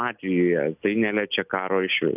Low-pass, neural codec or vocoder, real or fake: 3.6 kHz; none; real